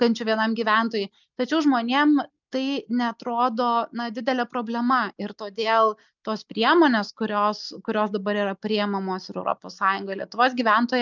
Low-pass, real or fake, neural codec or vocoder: 7.2 kHz; real; none